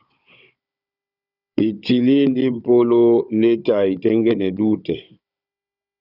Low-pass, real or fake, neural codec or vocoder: 5.4 kHz; fake; codec, 16 kHz, 16 kbps, FunCodec, trained on Chinese and English, 50 frames a second